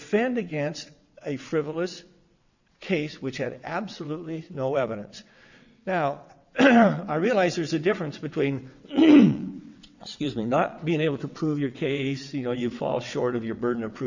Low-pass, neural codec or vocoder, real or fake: 7.2 kHz; vocoder, 22.05 kHz, 80 mel bands, WaveNeXt; fake